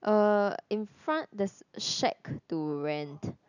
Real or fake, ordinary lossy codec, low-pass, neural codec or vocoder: real; none; 7.2 kHz; none